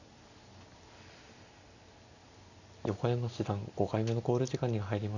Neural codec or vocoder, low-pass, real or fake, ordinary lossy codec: none; 7.2 kHz; real; none